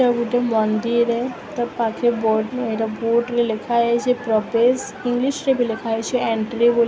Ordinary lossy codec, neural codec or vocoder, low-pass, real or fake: none; none; none; real